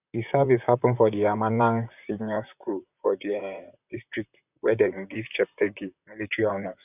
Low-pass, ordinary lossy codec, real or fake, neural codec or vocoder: 3.6 kHz; none; fake; vocoder, 44.1 kHz, 128 mel bands, Pupu-Vocoder